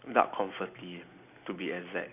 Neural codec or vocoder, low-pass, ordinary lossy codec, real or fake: none; 3.6 kHz; none; real